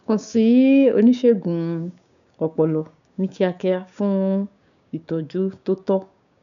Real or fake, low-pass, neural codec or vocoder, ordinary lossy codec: fake; 7.2 kHz; codec, 16 kHz, 6 kbps, DAC; none